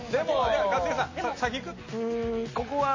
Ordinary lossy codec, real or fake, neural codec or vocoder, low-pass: MP3, 32 kbps; real; none; 7.2 kHz